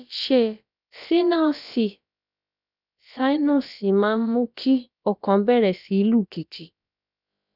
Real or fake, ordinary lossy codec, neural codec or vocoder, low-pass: fake; none; codec, 16 kHz, about 1 kbps, DyCAST, with the encoder's durations; 5.4 kHz